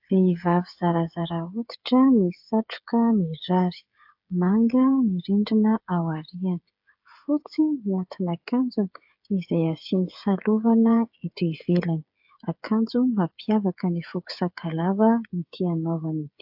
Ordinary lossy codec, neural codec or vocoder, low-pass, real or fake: AAC, 48 kbps; codec, 16 kHz, 8 kbps, FreqCodec, smaller model; 5.4 kHz; fake